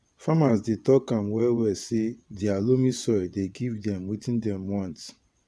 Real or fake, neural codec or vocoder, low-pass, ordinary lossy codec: fake; vocoder, 22.05 kHz, 80 mel bands, WaveNeXt; none; none